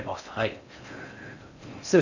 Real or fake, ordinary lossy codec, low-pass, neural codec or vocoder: fake; none; 7.2 kHz; codec, 16 kHz in and 24 kHz out, 0.6 kbps, FocalCodec, streaming, 4096 codes